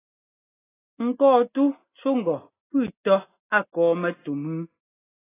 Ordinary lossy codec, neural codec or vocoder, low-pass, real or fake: AAC, 16 kbps; none; 3.6 kHz; real